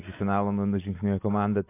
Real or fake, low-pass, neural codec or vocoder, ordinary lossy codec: real; 3.6 kHz; none; AAC, 24 kbps